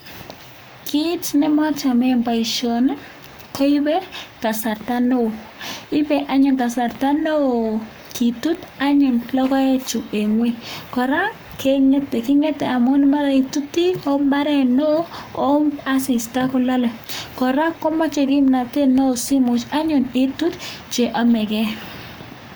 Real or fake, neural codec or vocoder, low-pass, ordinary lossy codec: fake; codec, 44.1 kHz, 7.8 kbps, Pupu-Codec; none; none